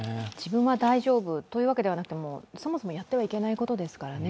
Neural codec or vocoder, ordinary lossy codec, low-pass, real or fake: none; none; none; real